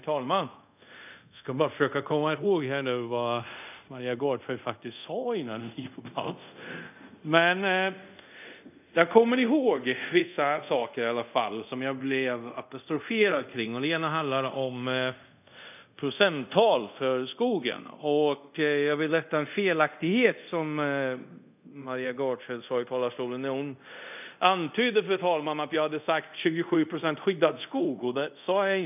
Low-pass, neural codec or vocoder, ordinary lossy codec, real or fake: 3.6 kHz; codec, 24 kHz, 0.5 kbps, DualCodec; none; fake